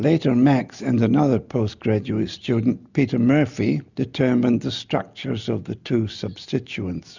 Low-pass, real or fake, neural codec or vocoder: 7.2 kHz; real; none